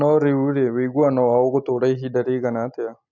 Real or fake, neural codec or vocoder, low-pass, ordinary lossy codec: real; none; 7.2 kHz; none